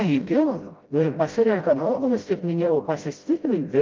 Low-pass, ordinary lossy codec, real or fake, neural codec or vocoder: 7.2 kHz; Opus, 24 kbps; fake; codec, 16 kHz, 0.5 kbps, FreqCodec, smaller model